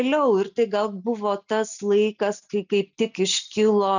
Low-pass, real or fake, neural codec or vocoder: 7.2 kHz; real; none